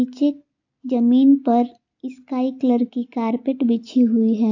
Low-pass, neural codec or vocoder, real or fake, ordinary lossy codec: 7.2 kHz; none; real; AAC, 48 kbps